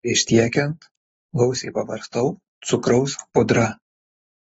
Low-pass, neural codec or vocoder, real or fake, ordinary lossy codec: 19.8 kHz; none; real; AAC, 24 kbps